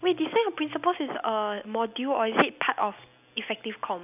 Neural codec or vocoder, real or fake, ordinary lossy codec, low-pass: none; real; none; 3.6 kHz